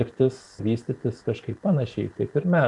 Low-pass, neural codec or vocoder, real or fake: 10.8 kHz; vocoder, 44.1 kHz, 128 mel bands every 512 samples, BigVGAN v2; fake